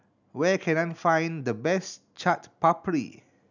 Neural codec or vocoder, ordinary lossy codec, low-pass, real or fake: none; none; 7.2 kHz; real